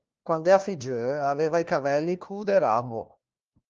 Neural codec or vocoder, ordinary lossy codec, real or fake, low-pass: codec, 16 kHz, 1 kbps, FunCodec, trained on LibriTTS, 50 frames a second; Opus, 24 kbps; fake; 7.2 kHz